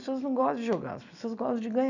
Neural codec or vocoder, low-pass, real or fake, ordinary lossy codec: none; 7.2 kHz; real; none